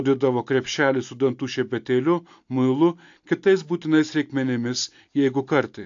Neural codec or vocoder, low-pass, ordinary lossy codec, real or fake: none; 7.2 kHz; AAC, 64 kbps; real